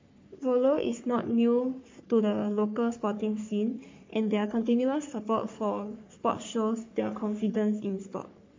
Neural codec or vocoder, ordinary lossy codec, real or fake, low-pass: codec, 44.1 kHz, 3.4 kbps, Pupu-Codec; MP3, 48 kbps; fake; 7.2 kHz